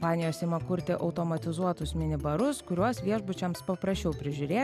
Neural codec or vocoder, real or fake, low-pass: none; real; 14.4 kHz